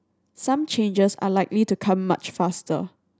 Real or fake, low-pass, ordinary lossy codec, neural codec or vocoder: real; none; none; none